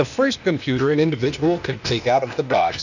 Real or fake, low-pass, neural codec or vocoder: fake; 7.2 kHz; codec, 16 kHz, 0.8 kbps, ZipCodec